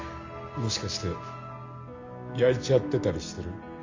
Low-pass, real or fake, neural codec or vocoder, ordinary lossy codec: 7.2 kHz; real; none; MP3, 64 kbps